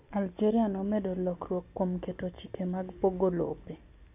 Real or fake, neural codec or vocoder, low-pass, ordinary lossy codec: real; none; 3.6 kHz; none